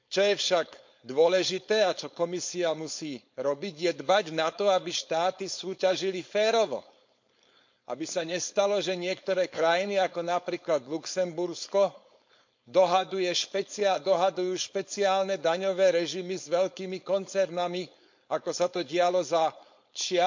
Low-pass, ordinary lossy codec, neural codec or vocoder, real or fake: 7.2 kHz; MP3, 48 kbps; codec, 16 kHz, 4.8 kbps, FACodec; fake